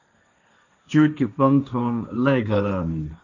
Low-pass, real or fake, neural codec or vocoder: 7.2 kHz; fake; codec, 16 kHz, 1.1 kbps, Voila-Tokenizer